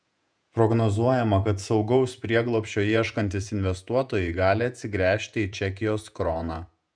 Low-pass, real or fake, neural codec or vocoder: 9.9 kHz; fake; vocoder, 48 kHz, 128 mel bands, Vocos